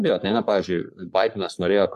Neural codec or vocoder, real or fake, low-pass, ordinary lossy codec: codec, 44.1 kHz, 3.4 kbps, Pupu-Codec; fake; 14.4 kHz; AAC, 96 kbps